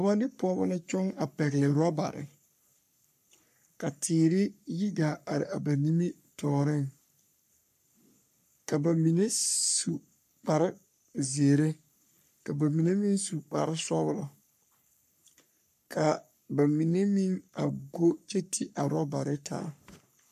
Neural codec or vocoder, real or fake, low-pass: codec, 44.1 kHz, 3.4 kbps, Pupu-Codec; fake; 14.4 kHz